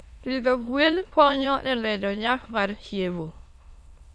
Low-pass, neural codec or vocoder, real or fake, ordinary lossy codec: none; autoencoder, 22.05 kHz, a latent of 192 numbers a frame, VITS, trained on many speakers; fake; none